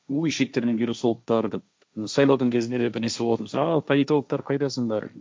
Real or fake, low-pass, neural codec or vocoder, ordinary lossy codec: fake; none; codec, 16 kHz, 1.1 kbps, Voila-Tokenizer; none